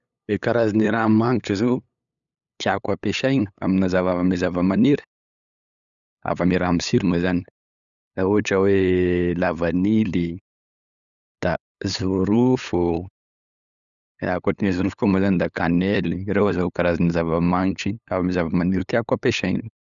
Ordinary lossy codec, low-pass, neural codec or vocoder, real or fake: none; 7.2 kHz; codec, 16 kHz, 8 kbps, FunCodec, trained on LibriTTS, 25 frames a second; fake